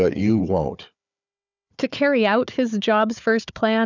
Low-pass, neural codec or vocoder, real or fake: 7.2 kHz; codec, 16 kHz, 4 kbps, FunCodec, trained on Chinese and English, 50 frames a second; fake